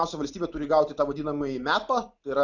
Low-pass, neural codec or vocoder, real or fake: 7.2 kHz; none; real